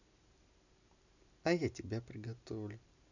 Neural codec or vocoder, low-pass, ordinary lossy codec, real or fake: none; 7.2 kHz; none; real